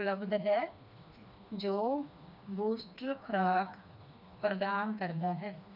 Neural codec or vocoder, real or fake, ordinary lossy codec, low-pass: codec, 16 kHz, 2 kbps, FreqCodec, smaller model; fake; none; 5.4 kHz